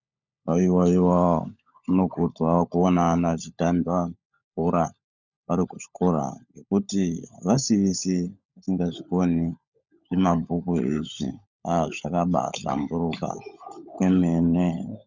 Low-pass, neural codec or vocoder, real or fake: 7.2 kHz; codec, 16 kHz, 16 kbps, FunCodec, trained on LibriTTS, 50 frames a second; fake